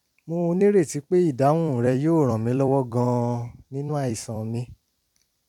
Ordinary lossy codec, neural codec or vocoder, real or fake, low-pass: none; vocoder, 44.1 kHz, 128 mel bands every 256 samples, BigVGAN v2; fake; 19.8 kHz